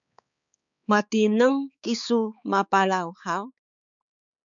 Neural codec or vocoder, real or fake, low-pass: codec, 16 kHz, 4 kbps, X-Codec, HuBERT features, trained on balanced general audio; fake; 7.2 kHz